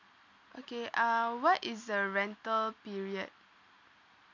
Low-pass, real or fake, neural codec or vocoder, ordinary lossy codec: 7.2 kHz; real; none; none